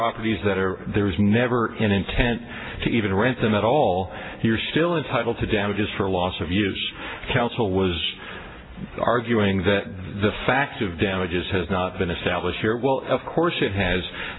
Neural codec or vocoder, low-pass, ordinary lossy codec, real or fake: none; 7.2 kHz; AAC, 16 kbps; real